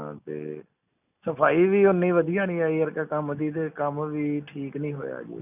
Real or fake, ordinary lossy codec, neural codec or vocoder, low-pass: real; none; none; 3.6 kHz